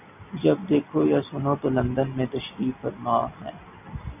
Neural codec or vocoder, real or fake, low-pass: none; real; 3.6 kHz